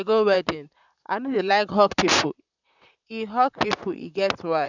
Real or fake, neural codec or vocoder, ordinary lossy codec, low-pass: fake; vocoder, 44.1 kHz, 128 mel bands, Pupu-Vocoder; none; 7.2 kHz